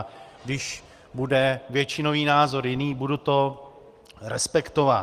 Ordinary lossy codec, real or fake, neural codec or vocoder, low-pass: Opus, 24 kbps; real; none; 14.4 kHz